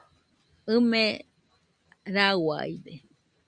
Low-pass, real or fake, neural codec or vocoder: 9.9 kHz; real; none